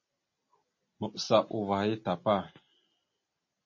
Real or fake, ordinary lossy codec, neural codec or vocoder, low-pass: real; MP3, 32 kbps; none; 7.2 kHz